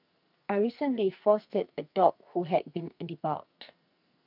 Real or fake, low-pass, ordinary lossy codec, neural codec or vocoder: fake; 5.4 kHz; none; codec, 44.1 kHz, 2.6 kbps, SNAC